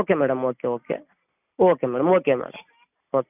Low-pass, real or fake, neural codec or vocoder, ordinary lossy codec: 3.6 kHz; real; none; none